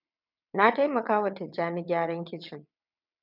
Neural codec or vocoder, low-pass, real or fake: vocoder, 22.05 kHz, 80 mel bands, WaveNeXt; 5.4 kHz; fake